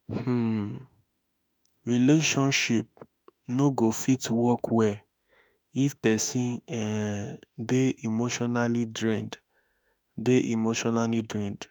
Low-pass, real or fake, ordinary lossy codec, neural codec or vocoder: none; fake; none; autoencoder, 48 kHz, 32 numbers a frame, DAC-VAE, trained on Japanese speech